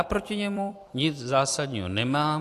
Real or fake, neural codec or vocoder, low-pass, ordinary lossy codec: fake; vocoder, 44.1 kHz, 128 mel bands every 256 samples, BigVGAN v2; 14.4 kHz; AAC, 64 kbps